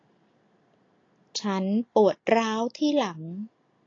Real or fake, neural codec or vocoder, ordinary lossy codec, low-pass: real; none; AAC, 32 kbps; 7.2 kHz